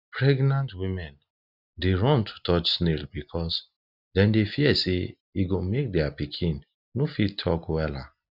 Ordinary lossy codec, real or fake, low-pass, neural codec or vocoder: none; real; 5.4 kHz; none